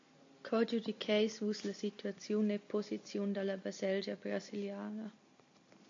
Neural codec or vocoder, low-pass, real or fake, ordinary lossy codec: none; 7.2 kHz; real; AAC, 48 kbps